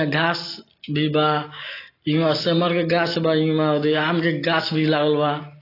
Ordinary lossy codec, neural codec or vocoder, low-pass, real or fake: AAC, 24 kbps; none; 5.4 kHz; real